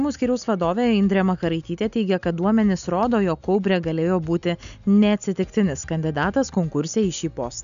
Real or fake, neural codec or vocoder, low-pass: real; none; 7.2 kHz